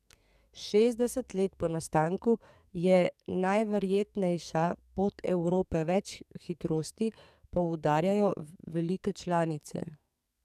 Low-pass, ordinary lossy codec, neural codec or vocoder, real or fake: 14.4 kHz; none; codec, 44.1 kHz, 2.6 kbps, SNAC; fake